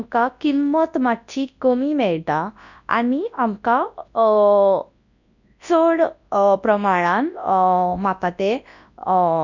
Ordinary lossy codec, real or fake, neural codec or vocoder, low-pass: none; fake; codec, 24 kHz, 0.9 kbps, WavTokenizer, large speech release; 7.2 kHz